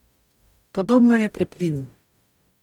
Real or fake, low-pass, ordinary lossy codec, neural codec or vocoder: fake; 19.8 kHz; none; codec, 44.1 kHz, 0.9 kbps, DAC